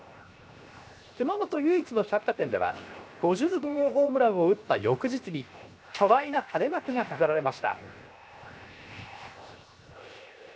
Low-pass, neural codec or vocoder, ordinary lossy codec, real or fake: none; codec, 16 kHz, 0.7 kbps, FocalCodec; none; fake